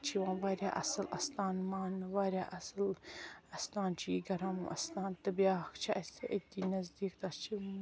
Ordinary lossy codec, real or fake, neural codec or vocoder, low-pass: none; real; none; none